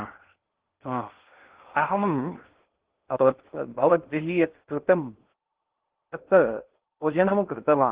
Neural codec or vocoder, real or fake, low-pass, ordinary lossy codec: codec, 16 kHz in and 24 kHz out, 0.6 kbps, FocalCodec, streaming, 2048 codes; fake; 3.6 kHz; Opus, 16 kbps